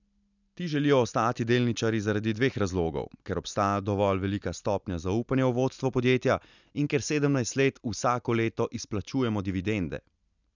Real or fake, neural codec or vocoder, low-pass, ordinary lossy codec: real; none; 7.2 kHz; none